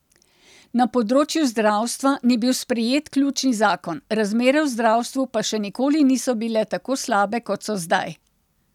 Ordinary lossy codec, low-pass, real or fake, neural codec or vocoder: none; 19.8 kHz; real; none